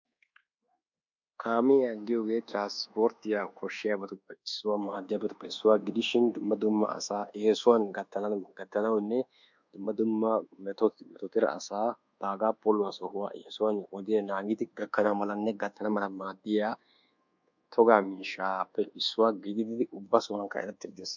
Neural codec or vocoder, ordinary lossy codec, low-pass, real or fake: codec, 24 kHz, 1.2 kbps, DualCodec; MP3, 64 kbps; 7.2 kHz; fake